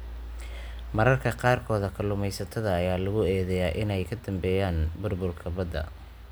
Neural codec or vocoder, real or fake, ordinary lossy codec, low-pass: none; real; none; none